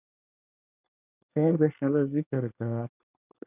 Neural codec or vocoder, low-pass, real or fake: codec, 24 kHz, 1 kbps, SNAC; 3.6 kHz; fake